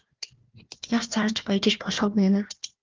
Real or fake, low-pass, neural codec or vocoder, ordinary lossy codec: fake; 7.2 kHz; codec, 16 kHz, 1 kbps, FunCodec, trained on Chinese and English, 50 frames a second; Opus, 32 kbps